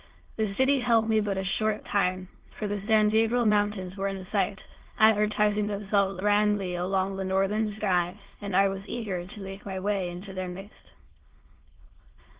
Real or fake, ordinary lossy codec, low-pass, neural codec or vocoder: fake; Opus, 16 kbps; 3.6 kHz; autoencoder, 22.05 kHz, a latent of 192 numbers a frame, VITS, trained on many speakers